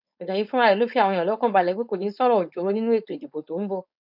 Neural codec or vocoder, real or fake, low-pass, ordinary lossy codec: codec, 16 kHz, 4.8 kbps, FACodec; fake; 5.4 kHz; none